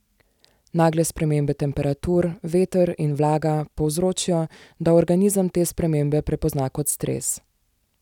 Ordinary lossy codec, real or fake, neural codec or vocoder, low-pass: none; real; none; 19.8 kHz